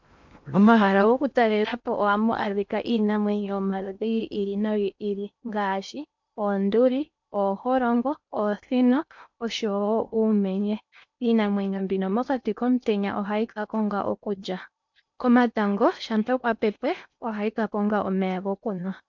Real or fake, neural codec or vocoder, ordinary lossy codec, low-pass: fake; codec, 16 kHz in and 24 kHz out, 0.8 kbps, FocalCodec, streaming, 65536 codes; MP3, 64 kbps; 7.2 kHz